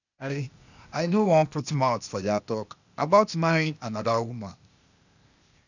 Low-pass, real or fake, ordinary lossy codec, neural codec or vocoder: 7.2 kHz; fake; none; codec, 16 kHz, 0.8 kbps, ZipCodec